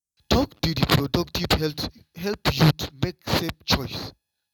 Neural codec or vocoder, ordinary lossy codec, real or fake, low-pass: none; none; real; 19.8 kHz